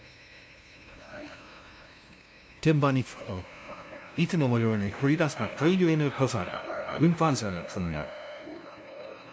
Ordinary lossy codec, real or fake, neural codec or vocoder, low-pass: none; fake; codec, 16 kHz, 0.5 kbps, FunCodec, trained on LibriTTS, 25 frames a second; none